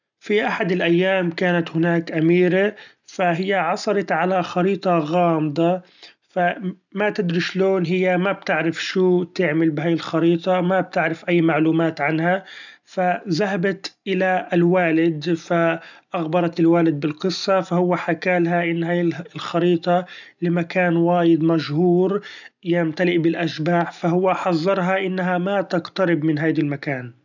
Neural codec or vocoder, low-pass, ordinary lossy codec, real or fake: none; 7.2 kHz; none; real